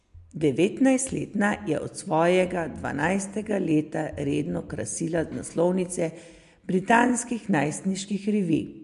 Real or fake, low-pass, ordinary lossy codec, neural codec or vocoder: real; 10.8 kHz; MP3, 64 kbps; none